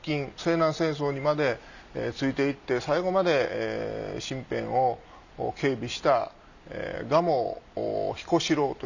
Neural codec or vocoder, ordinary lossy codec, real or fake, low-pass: none; none; real; 7.2 kHz